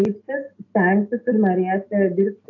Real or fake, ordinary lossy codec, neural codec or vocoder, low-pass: real; AAC, 48 kbps; none; 7.2 kHz